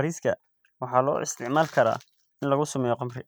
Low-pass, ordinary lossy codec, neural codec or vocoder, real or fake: none; none; none; real